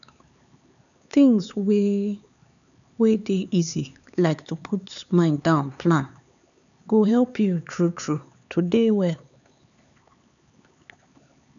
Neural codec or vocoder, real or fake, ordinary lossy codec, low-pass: codec, 16 kHz, 4 kbps, X-Codec, HuBERT features, trained on LibriSpeech; fake; none; 7.2 kHz